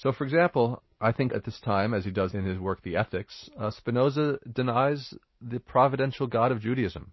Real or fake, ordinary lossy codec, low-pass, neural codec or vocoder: real; MP3, 24 kbps; 7.2 kHz; none